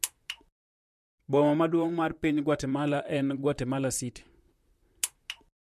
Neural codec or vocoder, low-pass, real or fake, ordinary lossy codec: vocoder, 44.1 kHz, 128 mel bands, Pupu-Vocoder; 14.4 kHz; fake; MP3, 64 kbps